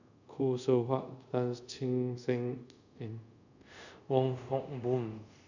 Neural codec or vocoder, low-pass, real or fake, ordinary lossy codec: codec, 24 kHz, 0.5 kbps, DualCodec; 7.2 kHz; fake; none